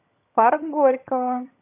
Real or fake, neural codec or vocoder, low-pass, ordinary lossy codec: fake; vocoder, 22.05 kHz, 80 mel bands, HiFi-GAN; 3.6 kHz; AAC, 32 kbps